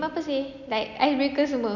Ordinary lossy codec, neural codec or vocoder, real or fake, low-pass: none; none; real; 7.2 kHz